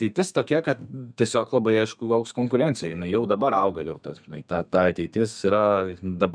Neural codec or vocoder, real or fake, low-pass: codec, 32 kHz, 1.9 kbps, SNAC; fake; 9.9 kHz